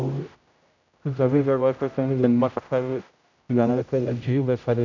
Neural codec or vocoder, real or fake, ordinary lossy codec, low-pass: codec, 16 kHz, 0.5 kbps, X-Codec, HuBERT features, trained on general audio; fake; none; 7.2 kHz